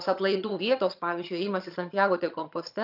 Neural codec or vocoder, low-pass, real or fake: vocoder, 22.05 kHz, 80 mel bands, HiFi-GAN; 5.4 kHz; fake